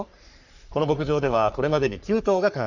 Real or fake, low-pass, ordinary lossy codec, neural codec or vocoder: fake; 7.2 kHz; none; codec, 44.1 kHz, 3.4 kbps, Pupu-Codec